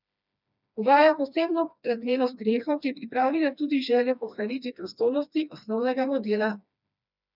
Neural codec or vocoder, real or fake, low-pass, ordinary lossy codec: codec, 16 kHz, 2 kbps, FreqCodec, smaller model; fake; 5.4 kHz; none